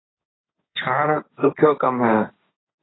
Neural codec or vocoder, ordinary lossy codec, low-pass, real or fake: codec, 16 kHz, 1.1 kbps, Voila-Tokenizer; AAC, 16 kbps; 7.2 kHz; fake